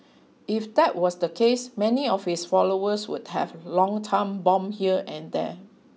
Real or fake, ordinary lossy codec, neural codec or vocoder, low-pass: real; none; none; none